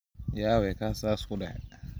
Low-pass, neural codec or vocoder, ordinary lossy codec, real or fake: none; none; none; real